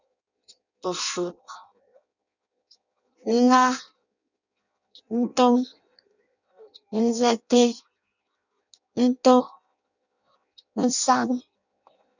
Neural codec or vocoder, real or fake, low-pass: codec, 16 kHz in and 24 kHz out, 0.6 kbps, FireRedTTS-2 codec; fake; 7.2 kHz